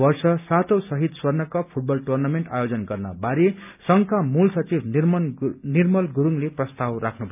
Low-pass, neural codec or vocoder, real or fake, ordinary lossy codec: 3.6 kHz; none; real; none